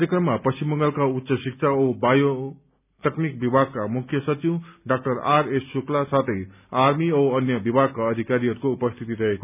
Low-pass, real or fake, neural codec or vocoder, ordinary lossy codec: 3.6 kHz; real; none; none